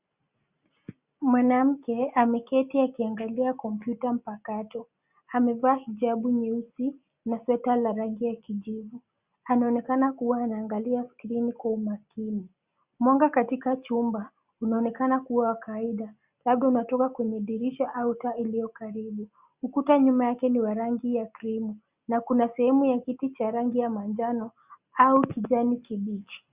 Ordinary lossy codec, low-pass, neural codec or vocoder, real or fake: Opus, 64 kbps; 3.6 kHz; none; real